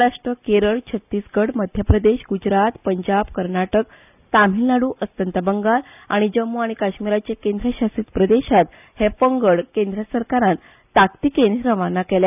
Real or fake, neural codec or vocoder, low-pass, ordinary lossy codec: real; none; 3.6 kHz; none